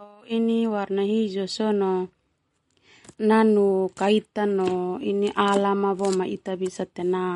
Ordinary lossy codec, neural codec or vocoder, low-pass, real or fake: MP3, 48 kbps; none; 19.8 kHz; real